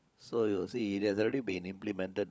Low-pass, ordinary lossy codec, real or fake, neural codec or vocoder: none; none; fake; codec, 16 kHz, 16 kbps, FunCodec, trained on LibriTTS, 50 frames a second